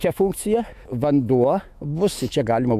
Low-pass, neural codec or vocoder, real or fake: 14.4 kHz; autoencoder, 48 kHz, 128 numbers a frame, DAC-VAE, trained on Japanese speech; fake